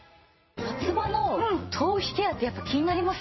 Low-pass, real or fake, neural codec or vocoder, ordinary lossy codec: 7.2 kHz; fake; vocoder, 44.1 kHz, 80 mel bands, Vocos; MP3, 24 kbps